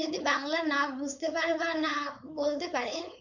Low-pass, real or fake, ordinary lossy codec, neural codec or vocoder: 7.2 kHz; fake; none; codec, 16 kHz, 4.8 kbps, FACodec